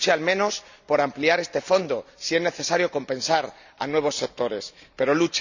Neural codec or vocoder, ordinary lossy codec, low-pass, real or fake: none; none; 7.2 kHz; real